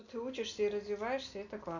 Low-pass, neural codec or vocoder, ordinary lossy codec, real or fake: 7.2 kHz; none; none; real